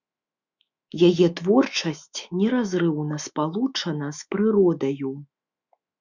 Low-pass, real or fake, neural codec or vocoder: 7.2 kHz; fake; autoencoder, 48 kHz, 128 numbers a frame, DAC-VAE, trained on Japanese speech